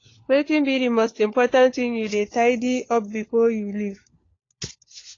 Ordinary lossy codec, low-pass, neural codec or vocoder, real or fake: AAC, 32 kbps; 7.2 kHz; codec, 16 kHz, 4 kbps, FunCodec, trained on LibriTTS, 50 frames a second; fake